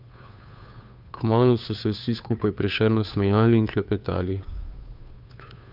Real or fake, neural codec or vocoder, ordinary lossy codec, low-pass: fake; codec, 16 kHz, 2 kbps, FunCodec, trained on Chinese and English, 25 frames a second; none; 5.4 kHz